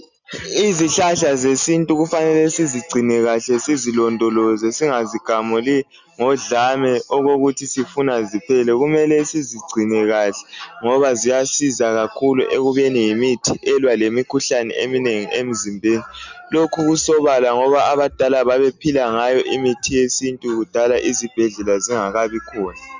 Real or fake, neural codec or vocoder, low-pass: real; none; 7.2 kHz